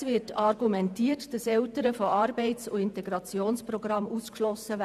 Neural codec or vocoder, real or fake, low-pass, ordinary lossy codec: vocoder, 48 kHz, 128 mel bands, Vocos; fake; 14.4 kHz; none